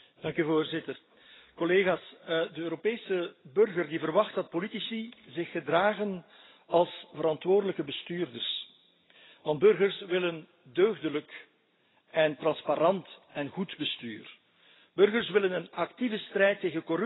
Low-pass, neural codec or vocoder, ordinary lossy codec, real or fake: 7.2 kHz; none; AAC, 16 kbps; real